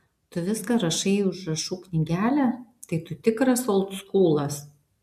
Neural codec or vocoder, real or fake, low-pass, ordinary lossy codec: none; real; 14.4 kHz; AAC, 96 kbps